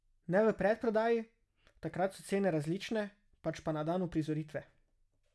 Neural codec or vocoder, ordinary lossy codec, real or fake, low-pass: none; none; real; none